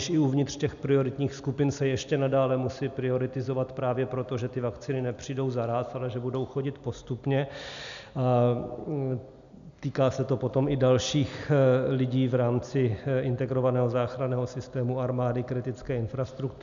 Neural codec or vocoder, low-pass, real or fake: none; 7.2 kHz; real